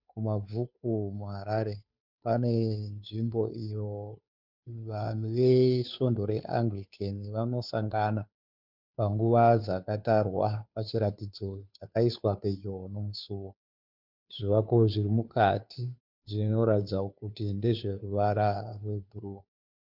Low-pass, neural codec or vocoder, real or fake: 5.4 kHz; codec, 16 kHz, 2 kbps, FunCodec, trained on Chinese and English, 25 frames a second; fake